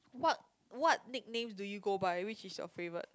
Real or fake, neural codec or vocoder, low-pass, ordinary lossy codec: real; none; none; none